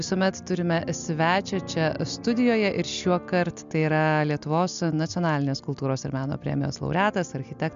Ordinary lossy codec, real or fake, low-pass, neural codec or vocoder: MP3, 64 kbps; real; 7.2 kHz; none